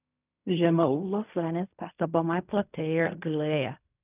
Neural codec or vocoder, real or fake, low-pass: codec, 16 kHz in and 24 kHz out, 0.4 kbps, LongCat-Audio-Codec, fine tuned four codebook decoder; fake; 3.6 kHz